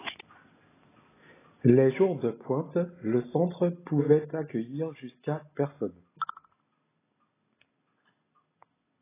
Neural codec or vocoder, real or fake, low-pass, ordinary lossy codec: none; real; 3.6 kHz; AAC, 16 kbps